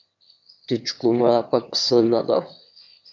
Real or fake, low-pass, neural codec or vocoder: fake; 7.2 kHz; autoencoder, 22.05 kHz, a latent of 192 numbers a frame, VITS, trained on one speaker